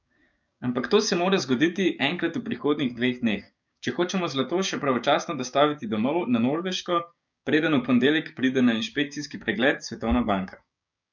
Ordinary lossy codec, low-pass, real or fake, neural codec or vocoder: none; 7.2 kHz; fake; codec, 16 kHz, 6 kbps, DAC